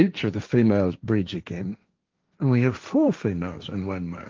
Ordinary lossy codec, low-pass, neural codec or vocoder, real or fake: Opus, 32 kbps; 7.2 kHz; codec, 16 kHz, 1.1 kbps, Voila-Tokenizer; fake